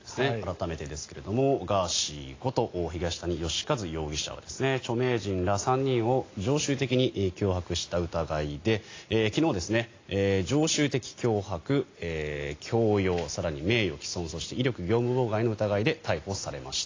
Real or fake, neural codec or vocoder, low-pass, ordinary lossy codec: real; none; 7.2 kHz; AAC, 32 kbps